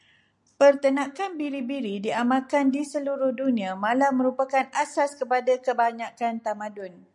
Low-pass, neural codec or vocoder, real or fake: 10.8 kHz; none; real